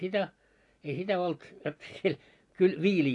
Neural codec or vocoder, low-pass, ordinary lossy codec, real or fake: none; 10.8 kHz; AAC, 48 kbps; real